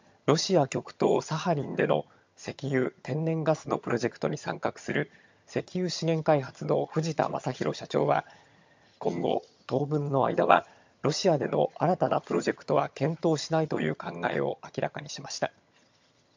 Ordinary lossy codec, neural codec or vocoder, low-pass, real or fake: MP3, 64 kbps; vocoder, 22.05 kHz, 80 mel bands, HiFi-GAN; 7.2 kHz; fake